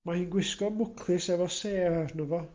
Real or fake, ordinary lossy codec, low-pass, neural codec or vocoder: real; Opus, 24 kbps; 7.2 kHz; none